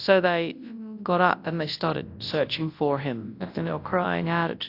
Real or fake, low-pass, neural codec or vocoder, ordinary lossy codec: fake; 5.4 kHz; codec, 24 kHz, 0.9 kbps, WavTokenizer, large speech release; AAC, 32 kbps